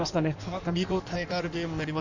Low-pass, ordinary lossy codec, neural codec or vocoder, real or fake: 7.2 kHz; none; codec, 16 kHz in and 24 kHz out, 1.1 kbps, FireRedTTS-2 codec; fake